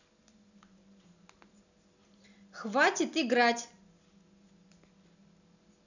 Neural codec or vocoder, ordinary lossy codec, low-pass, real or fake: none; none; 7.2 kHz; real